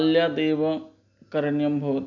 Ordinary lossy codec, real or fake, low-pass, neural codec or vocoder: none; real; 7.2 kHz; none